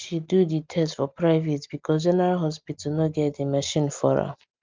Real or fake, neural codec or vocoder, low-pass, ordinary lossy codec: real; none; 7.2 kHz; Opus, 24 kbps